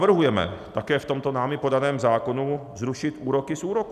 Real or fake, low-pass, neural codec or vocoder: real; 14.4 kHz; none